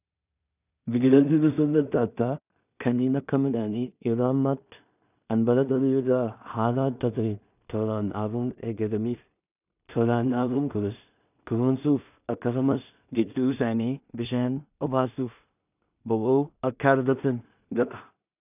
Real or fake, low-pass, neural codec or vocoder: fake; 3.6 kHz; codec, 16 kHz in and 24 kHz out, 0.4 kbps, LongCat-Audio-Codec, two codebook decoder